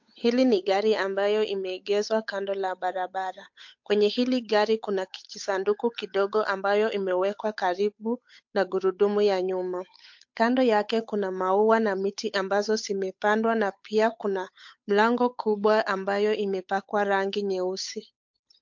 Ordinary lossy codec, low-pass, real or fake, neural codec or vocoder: MP3, 48 kbps; 7.2 kHz; fake; codec, 16 kHz, 8 kbps, FunCodec, trained on Chinese and English, 25 frames a second